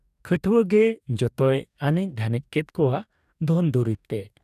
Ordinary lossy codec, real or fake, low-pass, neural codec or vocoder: none; fake; 14.4 kHz; codec, 44.1 kHz, 2.6 kbps, DAC